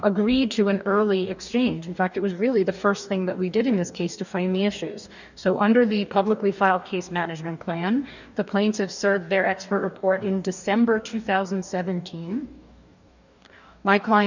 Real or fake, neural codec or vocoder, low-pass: fake; codec, 44.1 kHz, 2.6 kbps, DAC; 7.2 kHz